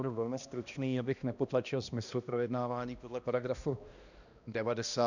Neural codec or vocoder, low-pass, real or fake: codec, 16 kHz, 1 kbps, X-Codec, HuBERT features, trained on balanced general audio; 7.2 kHz; fake